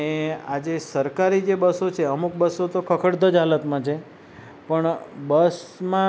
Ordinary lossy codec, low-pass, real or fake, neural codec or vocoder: none; none; real; none